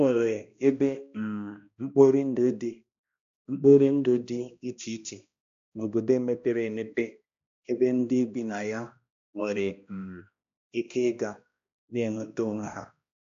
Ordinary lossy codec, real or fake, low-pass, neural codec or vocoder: AAC, 64 kbps; fake; 7.2 kHz; codec, 16 kHz, 1 kbps, X-Codec, HuBERT features, trained on balanced general audio